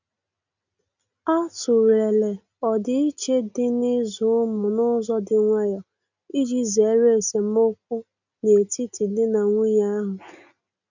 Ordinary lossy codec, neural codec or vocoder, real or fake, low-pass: none; none; real; 7.2 kHz